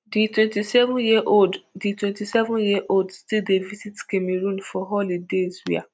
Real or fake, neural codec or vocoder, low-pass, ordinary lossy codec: real; none; none; none